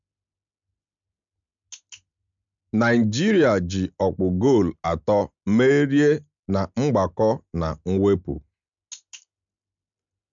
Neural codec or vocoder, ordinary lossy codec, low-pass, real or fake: none; MP3, 64 kbps; 7.2 kHz; real